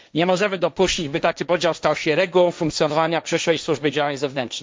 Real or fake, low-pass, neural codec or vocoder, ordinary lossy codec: fake; none; codec, 16 kHz, 1.1 kbps, Voila-Tokenizer; none